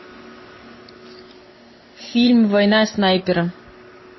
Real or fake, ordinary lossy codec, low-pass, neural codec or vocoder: real; MP3, 24 kbps; 7.2 kHz; none